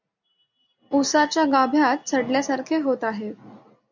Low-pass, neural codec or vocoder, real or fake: 7.2 kHz; none; real